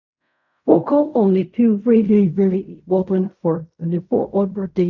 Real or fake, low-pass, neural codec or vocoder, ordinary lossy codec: fake; 7.2 kHz; codec, 16 kHz in and 24 kHz out, 0.4 kbps, LongCat-Audio-Codec, fine tuned four codebook decoder; none